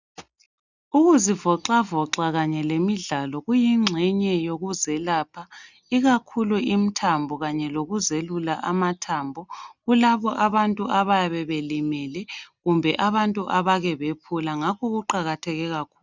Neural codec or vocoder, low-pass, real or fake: none; 7.2 kHz; real